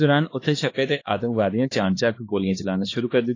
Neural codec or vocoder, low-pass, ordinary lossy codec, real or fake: codec, 16 kHz, 2 kbps, X-Codec, HuBERT features, trained on LibriSpeech; 7.2 kHz; AAC, 32 kbps; fake